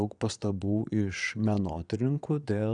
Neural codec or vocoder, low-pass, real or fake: vocoder, 22.05 kHz, 80 mel bands, Vocos; 9.9 kHz; fake